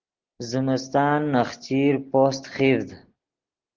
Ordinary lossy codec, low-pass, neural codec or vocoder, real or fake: Opus, 16 kbps; 7.2 kHz; none; real